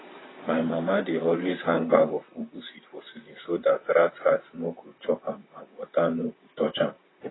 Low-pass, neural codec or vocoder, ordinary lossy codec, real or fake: 7.2 kHz; vocoder, 44.1 kHz, 128 mel bands, Pupu-Vocoder; AAC, 16 kbps; fake